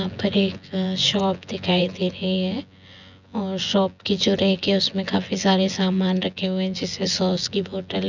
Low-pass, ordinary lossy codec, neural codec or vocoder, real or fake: 7.2 kHz; none; vocoder, 24 kHz, 100 mel bands, Vocos; fake